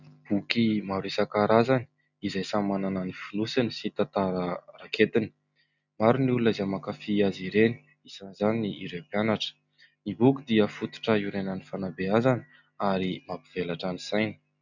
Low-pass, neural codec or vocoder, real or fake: 7.2 kHz; none; real